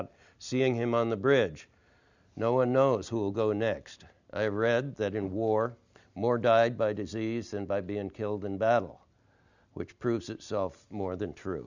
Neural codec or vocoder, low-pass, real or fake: none; 7.2 kHz; real